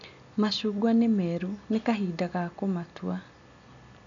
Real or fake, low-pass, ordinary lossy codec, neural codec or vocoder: real; 7.2 kHz; AAC, 64 kbps; none